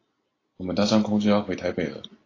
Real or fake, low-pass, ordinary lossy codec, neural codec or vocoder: real; 7.2 kHz; AAC, 32 kbps; none